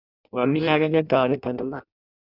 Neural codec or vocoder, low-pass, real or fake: codec, 16 kHz in and 24 kHz out, 0.6 kbps, FireRedTTS-2 codec; 5.4 kHz; fake